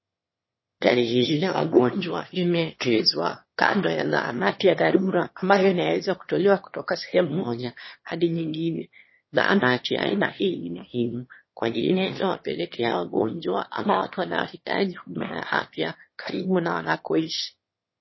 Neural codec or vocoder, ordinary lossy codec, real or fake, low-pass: autoencoder, 22.05 kHz, a latent of 192 numbers a frame, VITS, trained on one speaker; MP3, 24 kbps; fake; 7.2 kHz